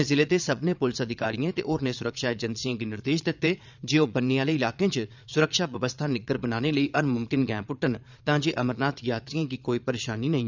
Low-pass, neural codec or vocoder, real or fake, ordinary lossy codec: 7.2 kHz; vocoder, 22.05 kHz, 80 mel bands, Vocos; fake; none